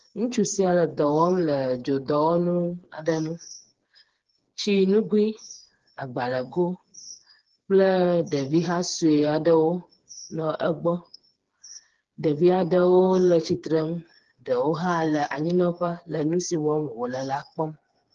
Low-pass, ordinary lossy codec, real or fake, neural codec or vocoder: 7.2 kHz; Opus, 16 kbps; fake; codec, 16 kHz, 4 kbps, FreqCodec, smaller model